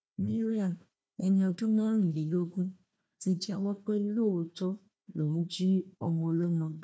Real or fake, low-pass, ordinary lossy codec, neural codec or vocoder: fake; none; none; codec, 16 kHz, 1 kbps, FunCodec, trained on Chinese and English, 50 frames a second